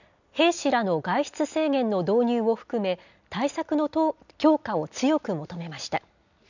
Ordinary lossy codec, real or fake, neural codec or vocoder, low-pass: none; real; none; 7.2 kHz